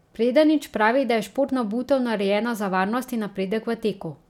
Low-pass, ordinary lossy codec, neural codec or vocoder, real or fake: 19.8 kHz; none; none; real